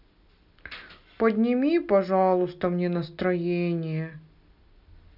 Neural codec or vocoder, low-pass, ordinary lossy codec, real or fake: none; 5.4 kHz; none; real